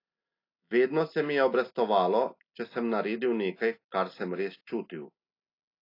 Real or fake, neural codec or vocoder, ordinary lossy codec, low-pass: real; none; AAC, 32 kbps; 5.4 kHz